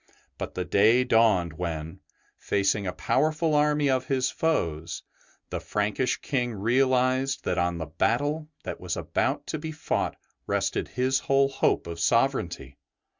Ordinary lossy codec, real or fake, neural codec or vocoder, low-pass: Opus, 64 kbps; real; none; 7.2 kHz